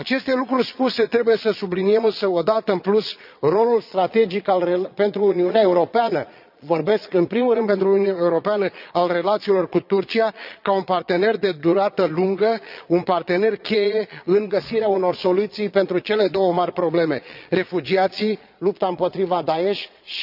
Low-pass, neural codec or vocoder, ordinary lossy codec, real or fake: 5.4 kHz; vocoder, 22.05 kHz, 80 mel bands, Vocos; none; fake